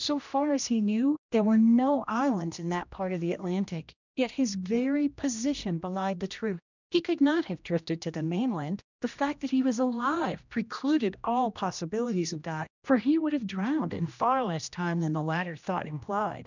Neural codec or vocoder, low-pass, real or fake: codec, 16 kHz, 1 kbps, X-Codec, HuBERT features, trained on general audio; 7.2 kHz; fake